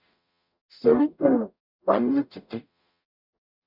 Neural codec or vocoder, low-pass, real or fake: codec, 44.1 kHz, 0.9 kbps, DAC; 5.4 kHz; fake